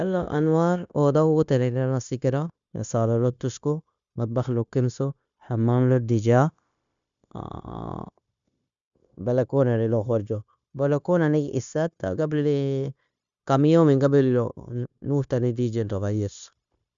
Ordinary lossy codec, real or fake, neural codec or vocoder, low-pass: none; fake; codec, 16 kHz, 0.9 kbps, LongCat-Audio-Codec; 7.2 kHz